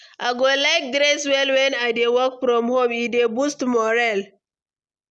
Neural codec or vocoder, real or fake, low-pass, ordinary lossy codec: none; real; none; none